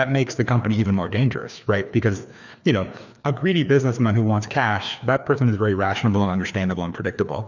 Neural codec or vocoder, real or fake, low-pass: codec, 16 kHz, 2 kbps, FreqCodec, larger model; fake; 7.2 kHz